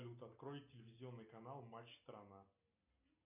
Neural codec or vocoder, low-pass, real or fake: none; 3.6 kHz; real